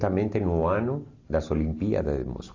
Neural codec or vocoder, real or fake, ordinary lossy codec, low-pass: none; real; AAC, 32 kbps; 7.2 kHz